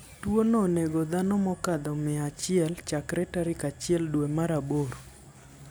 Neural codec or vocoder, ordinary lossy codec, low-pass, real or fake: none; none; none; real